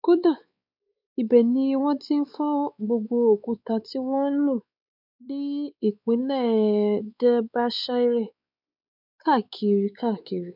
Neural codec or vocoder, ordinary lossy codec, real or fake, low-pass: codec, 16 kHz, 4 kbps, X-Codec, WavLM features, trained on Multilingual LibriSpeech; none; fake; 5.4 kHz